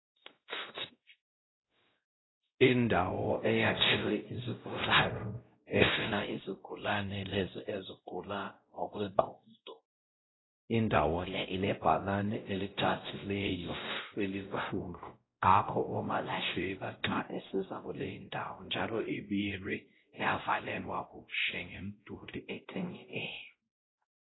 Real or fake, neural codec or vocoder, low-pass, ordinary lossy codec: fake; codec, 16 kHz, 0.5 kbps, X-Codec, WavLM features, trained on Multilingual LibriSpeech; 7.2 kHz; AAC, 16 kbps